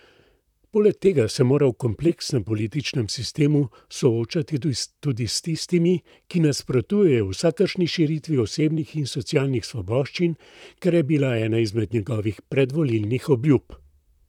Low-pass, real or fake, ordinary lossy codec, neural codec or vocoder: 19.8 kHz; fake; none; vocoder, 44.1 kHz, 128 mel bands, Pupu-Vocoder